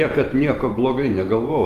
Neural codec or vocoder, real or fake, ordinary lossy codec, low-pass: vocoder, 44.1 kHz, 128 mel bands every 512 samples, BigVGAN v2; fake; Opus, 32 kbps; 14.4 kHz